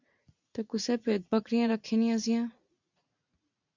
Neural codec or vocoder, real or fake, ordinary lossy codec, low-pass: vocoder, 44.1 kHz, 80 mel bands, Vocos; fake; MP3, 64 kbps; 7.2 kHz